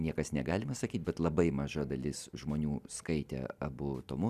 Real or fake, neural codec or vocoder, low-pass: fake; vocoder, 44.1 kHz, 128 mel bands every 256 samples, BigVGAN v2; 14.4 kHz